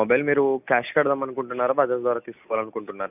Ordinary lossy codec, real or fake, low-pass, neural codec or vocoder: none; real; 3.6 kHz; none